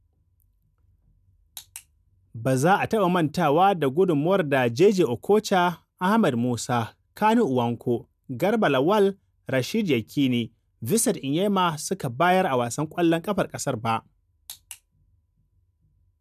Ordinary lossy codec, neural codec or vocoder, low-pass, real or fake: none; none; 14.4 kHz; real